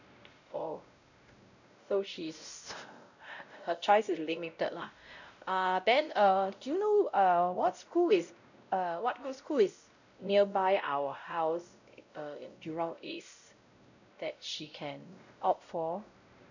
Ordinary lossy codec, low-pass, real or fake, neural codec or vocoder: none; 7.2 kHz; fake; codec, 16 kHz, 0.5 kbps, X-Codec, WavLM features, trained on Multilingual LibriSpeech